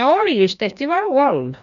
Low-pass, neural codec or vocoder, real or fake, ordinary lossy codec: 7.2 kHz; codec, 16 kHz, 1 kbps, FreqCodec, larger model; fake; none